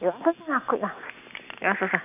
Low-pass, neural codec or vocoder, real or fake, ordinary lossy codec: 3.6 kHz; none; real; none